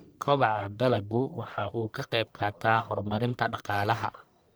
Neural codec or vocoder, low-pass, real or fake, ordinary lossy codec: codec, 44.1 kHz, 1.7 kbps, Pupu-Codec; none; fake; none